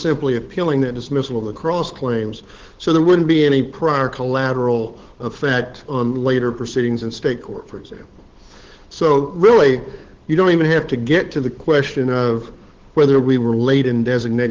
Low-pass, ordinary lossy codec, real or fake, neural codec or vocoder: 7.2 kHz; Opus, 16 kbps; fake; codec, 16 kHz, 8 kbps, FunCodec, trained on Chinese and English, 25 frames a second